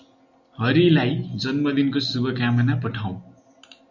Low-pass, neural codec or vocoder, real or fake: 7.2 kHz; none; real